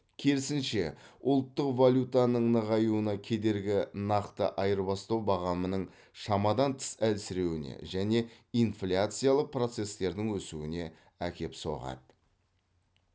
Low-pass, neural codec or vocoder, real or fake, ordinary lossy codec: none; none; real; none